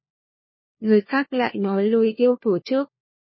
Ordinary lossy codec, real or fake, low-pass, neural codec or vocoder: MP3, 24 kbps; fake; 7.2 kHz; codec, 16 kHz, 1 kbps, FunCodec, trained on LibriTTS, 50 frames a second